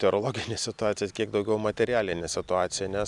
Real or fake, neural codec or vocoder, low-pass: real; none; 10.8 kHz